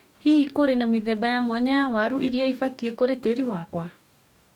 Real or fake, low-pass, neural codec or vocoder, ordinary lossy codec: fake; 19.8 kHz; codec, 44.1 kHz, 2.6 kbps, DAC; none